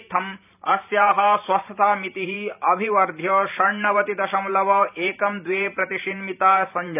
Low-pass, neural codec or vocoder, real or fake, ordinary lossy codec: 3.6 kHz; vocoder, 44.1 kHz, 128 mel bands every 512 samples, BigVGAN v2; fake; none